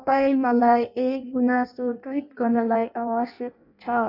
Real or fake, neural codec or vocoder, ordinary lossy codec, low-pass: fake; codec, 16 kHz in and 24 kHz out, 0.6 kbps, FireRedTTS-2 codec; none; 5.4 kHz